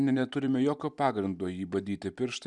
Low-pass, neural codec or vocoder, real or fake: 10.8 kHz; none; real